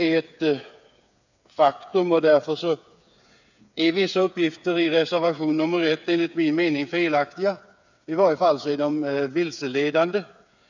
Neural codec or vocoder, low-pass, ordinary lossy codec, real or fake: codec, 16 kHz, 8 kbps, FreqCodec, smaller model; 7.2 kHz; none; fake